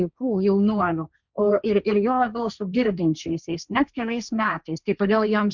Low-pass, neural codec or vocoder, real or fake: 7.2 kHz; codec, 16 kHz, 1.1 kbps, Voila-Tokenizer; fake